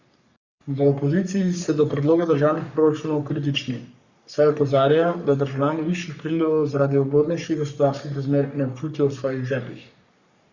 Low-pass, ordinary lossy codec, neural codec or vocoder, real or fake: 7.2 kHz; Opus, 64 kbps; codec, 44.1 kHz, 3.4 kbps, Pupu-Codec; fake